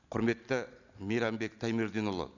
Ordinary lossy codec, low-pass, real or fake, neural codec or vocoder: none; 7.2 kHz; real; none